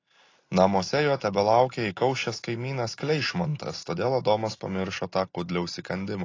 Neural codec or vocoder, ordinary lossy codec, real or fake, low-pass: none; AAC, 32 kbps; real; 7.2 kHz